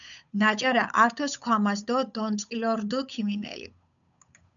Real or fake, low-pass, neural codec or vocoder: fake; 7.2 kHz; codec, 16 kHz, 8 kbps, FunCodec, trained on Chinese and English, 25 frames a second